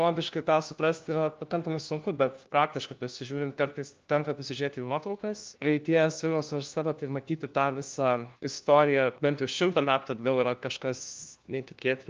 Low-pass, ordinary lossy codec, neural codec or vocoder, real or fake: 7.2 kHz; Opus, 24 kbps; codec, 16 kHz, 1 kbps, FunCodec, trained on LibriTTS, 50 frames a second; fake